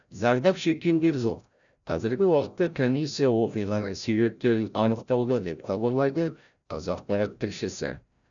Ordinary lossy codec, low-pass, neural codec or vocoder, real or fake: none; 7.2 kHz; codec, 16 kHz, 0.5 kbps, FreqCodec, larger model; fake